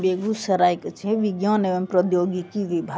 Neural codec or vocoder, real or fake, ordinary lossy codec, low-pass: none; real; none; none